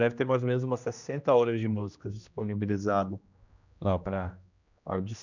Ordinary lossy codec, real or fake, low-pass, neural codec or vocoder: none; fake; 7.2 kHz; codec, 16 kHz, 1 kbps, X-Codec, HuBERT features, trained on general audio